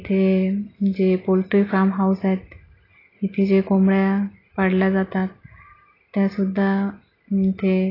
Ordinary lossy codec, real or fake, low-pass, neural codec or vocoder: AAC, 24 kbps; real; 5.4 kHz; none